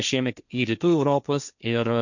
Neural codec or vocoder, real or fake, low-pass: codec, 16 kHz, 1.1 kbps, Voila-Tokenizer; fake; 7.2 kHz